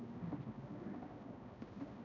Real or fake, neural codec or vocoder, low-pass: fake; codec, 16 kHz, 0.5 kbps, X-Codec, HuBERT features, trained on balanced general audio; 7.2 kHz